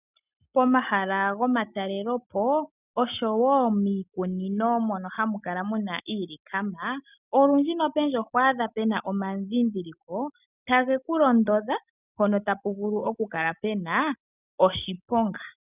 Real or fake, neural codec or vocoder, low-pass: real; none; 3.6 kHz